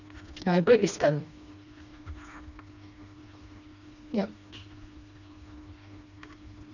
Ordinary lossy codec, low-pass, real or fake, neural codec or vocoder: none; 7.2 kHz; fake; codec, 16 kHz, 2 kbps, FreqCodec, smaller model